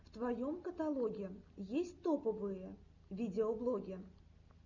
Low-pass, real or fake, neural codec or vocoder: 7.2 kHz; real; none